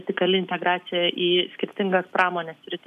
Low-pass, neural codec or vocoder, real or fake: 14.4 kHz; none; real